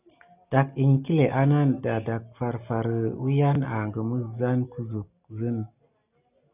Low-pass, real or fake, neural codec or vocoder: 3.6 kHz; real; none